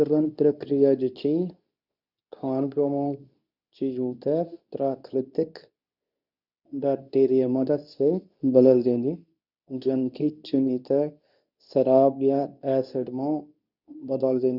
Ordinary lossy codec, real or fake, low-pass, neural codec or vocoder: none; fake; 5.4 kHz; codec, 24 kHz, 0.9 kbps, WavTokenizer, medium speech release version 2